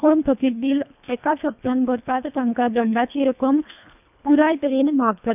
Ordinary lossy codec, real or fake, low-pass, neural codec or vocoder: none; fake; 3.6 kHz; codec, 24 kHz, 1.5 kbps, HILCodec